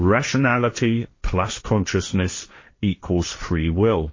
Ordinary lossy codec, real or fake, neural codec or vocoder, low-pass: MP3, 32 kbps; fake; codec, 16 kHz, 1.1 kbps, Voila-Tokenizer; 7.2 kHz